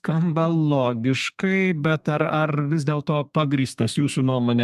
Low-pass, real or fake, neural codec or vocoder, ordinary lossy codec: 14.4 kHz; fake; codec, 32 kHz, 1.9 kbps, SNAC; MP3, 96 kbps